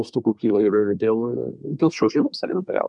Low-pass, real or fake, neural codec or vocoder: 10.8 kHz; fake; codec, 24 kHz, 1 kbps, SNAC